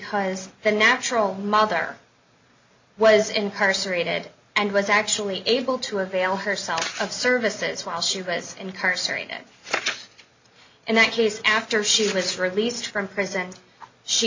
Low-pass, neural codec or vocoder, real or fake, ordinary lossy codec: 7.2 kHz; none; real; MP3, 64 kbps